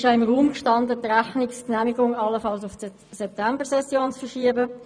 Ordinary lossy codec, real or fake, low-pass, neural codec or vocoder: none; fake; 9.9 kHz; vocoder, 24 kHz, 100 mel bands, Vocos